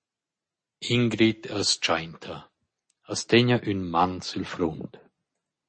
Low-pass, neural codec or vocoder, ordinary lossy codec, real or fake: 9.9 kHz; none; MP3, 32 kbps; real